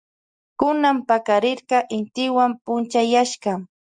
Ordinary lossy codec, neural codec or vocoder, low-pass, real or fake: Opus, 64 kbps; none; 9.9 kHz; real